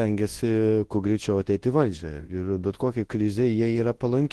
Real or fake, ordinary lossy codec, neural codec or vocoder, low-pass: fake; Opus, 16 kbps; codec, 24 kHz, 0.9 kbps, WavTokenizer, large speech release; 10.8 kHz